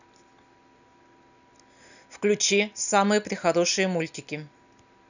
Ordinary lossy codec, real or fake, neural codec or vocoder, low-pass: none; real; none; 7.2 kHz